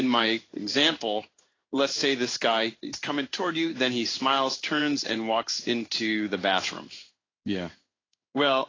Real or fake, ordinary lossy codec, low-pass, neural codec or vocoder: fake; AAC, 32 kbps; 7.2 kHz; codec, 16 kHz in and 24 kHz out, 1 kbps, XY-Tokenizer